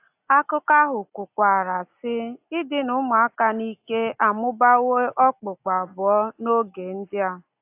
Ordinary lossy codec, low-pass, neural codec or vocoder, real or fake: AAC, 32 kbps; 3.6 kHz; none; real